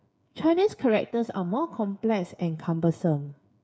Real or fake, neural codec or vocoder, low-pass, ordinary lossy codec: fake; codec, 16 kHz, 8 kbps, FreqCodec, smaller model; none; none